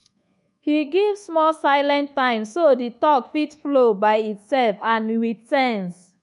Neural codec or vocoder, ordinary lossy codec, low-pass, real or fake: codec, 24 kHz, 1.2 kbps, DualCodec; MP3, 64 kbps; 10.8 kHz; fake